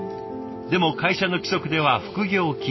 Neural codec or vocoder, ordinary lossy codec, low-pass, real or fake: none; MP3, 24 kbps; 7.2 kHz; real